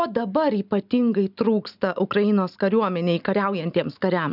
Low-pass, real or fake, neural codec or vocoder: 5.4 kHz; real; none